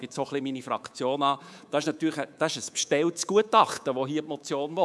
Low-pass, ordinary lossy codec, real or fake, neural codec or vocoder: none; none; fake; codec, 24 kHz, 3.1 kbps, DualCodec